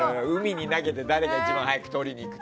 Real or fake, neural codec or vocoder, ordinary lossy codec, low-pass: real; none; none; none